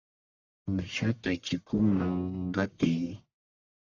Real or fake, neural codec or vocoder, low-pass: fake; codec, 44.1 kHz, 1.7 kbps, Pupu-Codec; 7.2 kHz